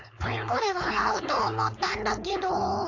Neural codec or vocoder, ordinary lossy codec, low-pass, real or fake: codec, 16 kHz, 4.8 kbps, FACodec; AAC, 48 kbps; 7.2 kHz; fake